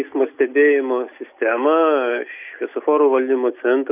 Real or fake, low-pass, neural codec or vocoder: real; 3.6 kHz; none